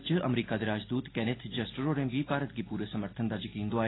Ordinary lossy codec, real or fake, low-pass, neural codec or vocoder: AAC, 16 kbps; real; 7.2 kHz; none